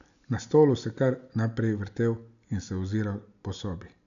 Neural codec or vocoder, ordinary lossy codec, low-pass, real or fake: none; none; 7.2 kHz; real